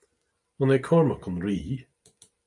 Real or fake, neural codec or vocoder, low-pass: real; none; 10.8 kHz